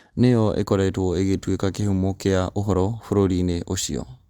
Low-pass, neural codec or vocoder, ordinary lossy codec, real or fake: 19.8 kHz; none; Opus, 32 kbps; real